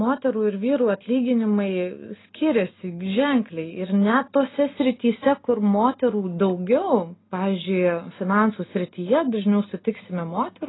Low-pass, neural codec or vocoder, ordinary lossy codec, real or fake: 7.2 kHz; none; AAC, 16 kbps; real